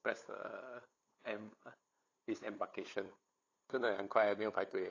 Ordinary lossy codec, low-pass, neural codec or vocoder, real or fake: AAC, 32 kbps; 7.2 kHz; codec, 16 kHz, 8 kbps, FunCodec, trained on LibriTTS, 25 frames a second; fake